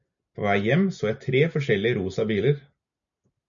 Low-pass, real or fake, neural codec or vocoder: 7.2 kHz; real; none